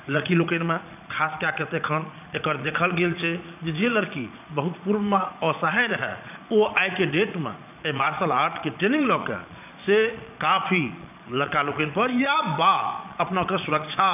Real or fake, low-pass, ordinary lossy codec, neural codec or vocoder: fake; 3.6 kHz; none; vocoder, 22.05 kHz, 80 mel bands, Vocos